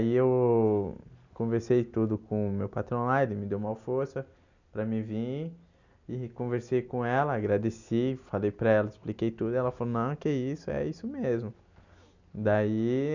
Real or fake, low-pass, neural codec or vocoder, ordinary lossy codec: real; 7.2 kHz; none; none